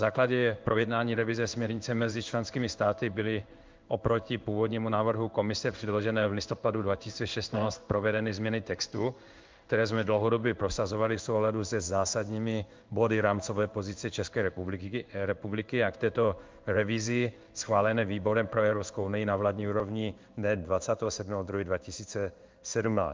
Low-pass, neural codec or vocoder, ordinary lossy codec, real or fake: 7.2 kHz; codec, 16 kHz in and 24 kHz out, 1 kbps, XY-Tokenizer; Opus, 24 kbps; fake